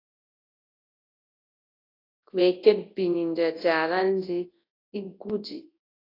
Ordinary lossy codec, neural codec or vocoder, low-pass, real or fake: AAC, 24 kbps; codec, 24 kHz, 0.9 kbps, WavTokenizer, large speech release; 5.4 kHz; fake